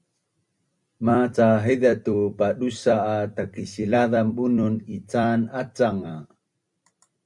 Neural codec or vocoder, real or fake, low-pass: vocoder, 44.1 kHz, 128 mel bands every 256 samples, BigVGAN v2; fake; 10.8 kHz